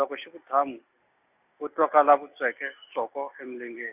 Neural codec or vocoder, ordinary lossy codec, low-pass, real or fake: none; none; 3.6 kHz; real